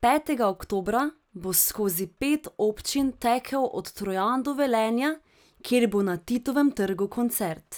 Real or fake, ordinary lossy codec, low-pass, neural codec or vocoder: real; none; none; none